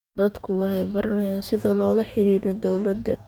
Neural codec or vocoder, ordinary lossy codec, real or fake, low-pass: codec, 44.1 kHz, 2.6 kbps, DAC; none; fake; 19.8 kHz